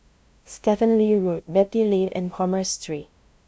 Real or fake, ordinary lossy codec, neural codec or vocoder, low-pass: fake; none; codec, 16 kHz, 0.5 kbps, FunCodec, trained on LibriTTS, 25 frames a second; none